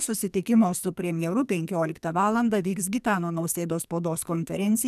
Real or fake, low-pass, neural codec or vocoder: fake; 14.4 kHz; codec, 44.1 kHz, 2.6 kbps, SNAC